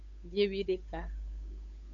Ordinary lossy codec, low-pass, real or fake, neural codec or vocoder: MP3, 64 kbps; 7.2 kHz; fake; codec, 16 kHz, 8 kbps, FunCodec, trained on Chinese and English, 25 frames a second